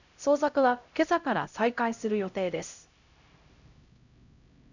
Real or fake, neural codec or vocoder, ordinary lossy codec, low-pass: fake; codec, 16 kHz, 0.5 kbps, X-Codec, HuBERT features, trained on LibriSpeech; none; 7.2 kHz